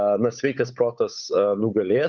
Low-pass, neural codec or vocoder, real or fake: 7.2 kHz; codec, 16 kHz, 8 kbps, FunCodec, trained on Chinese and English, 25 frames a second; fake